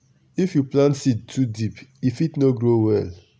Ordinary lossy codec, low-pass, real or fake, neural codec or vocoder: none; none; real; none